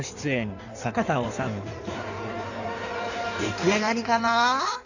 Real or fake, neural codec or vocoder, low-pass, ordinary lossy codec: fake; codec, 16 kHz in and 24 kHz out, 1.1 kbps, FireRedTTS-2 codec; 7.2 kHz; none